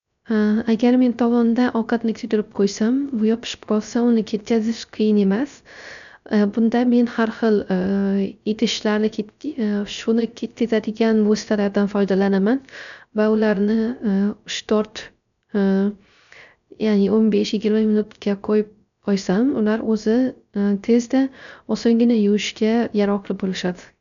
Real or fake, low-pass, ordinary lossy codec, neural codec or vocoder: fake; 7.2 kHz; none; codec, 16 kHz, 0.3 kbps, FocalCodec